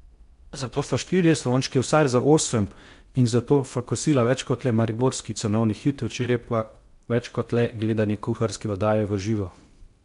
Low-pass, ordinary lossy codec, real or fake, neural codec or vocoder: 10.8 kHz; MP3, 64 kbps; fake; codec, 16 kHz in and 24 kHz out, 0.6 kbps, FocalCodec, streaming, 4096 codes